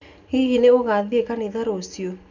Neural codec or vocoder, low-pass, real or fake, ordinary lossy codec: none; 7.2 kHz; real; none